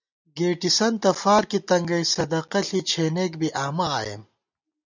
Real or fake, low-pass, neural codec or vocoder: real; 7.2 kHz; none